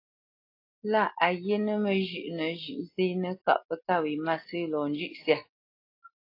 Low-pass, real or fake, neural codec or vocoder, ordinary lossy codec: 5.4 kHz; real; none; AAC, 32 kbps